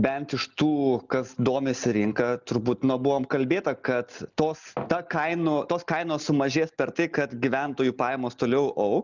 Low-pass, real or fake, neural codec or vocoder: 7.2 kHz; real; none